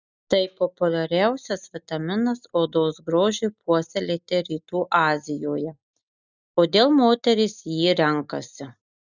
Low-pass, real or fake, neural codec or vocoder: 7.2 kHz; real; none